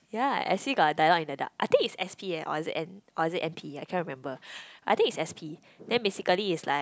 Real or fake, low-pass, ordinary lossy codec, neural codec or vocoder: real; none; none; none